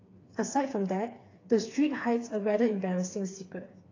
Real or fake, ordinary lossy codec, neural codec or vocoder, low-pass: fake; AAC, 32 kbps; codec, 16 kHz, 4 kbps, FreqCodec, smaller model; 7.2 kHz